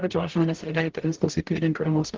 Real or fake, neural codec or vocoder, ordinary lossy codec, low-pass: fake; codec, 44.1 kHz, 0.9 kbps, DAC; Opus, 16 kbps; 7.2 kHz